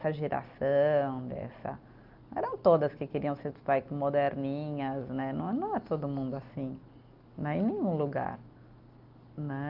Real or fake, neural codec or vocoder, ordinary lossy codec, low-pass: real; none; Opus, 24 kbps; 5.4 kHz